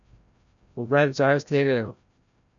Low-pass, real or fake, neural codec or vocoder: 7.2 kHz; fake; codec, 16 kHz, 0.5 kbps, FreqCodec, larger model